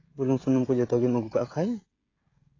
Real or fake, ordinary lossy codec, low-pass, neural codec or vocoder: fake; AAC, 32 kbps; 7.2 kHz; codec, 16 kHz, 16 kbps, FreqCodec, smaller model